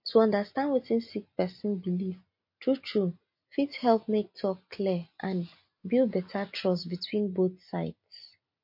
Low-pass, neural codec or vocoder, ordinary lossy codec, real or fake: 5.4 kHz; none; MP3, 32 kbps; real